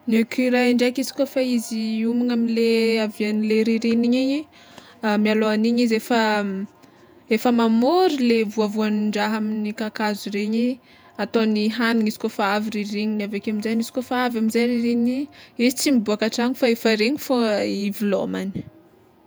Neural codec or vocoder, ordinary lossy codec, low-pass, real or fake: vocoder, 48 kHz, 128 mel bands, Vocos; none; none; fake